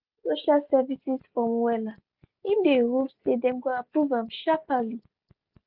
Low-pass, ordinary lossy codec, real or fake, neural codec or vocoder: 5.4 kHz; Opus, 64 kbps; real; none